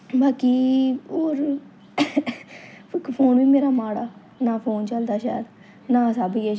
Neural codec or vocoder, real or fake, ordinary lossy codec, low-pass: none; real; none; none